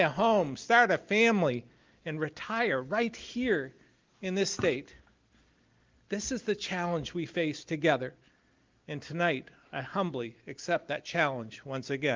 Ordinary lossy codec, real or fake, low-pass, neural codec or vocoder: Opus, 24 kbps; real; 7.2 kHz; none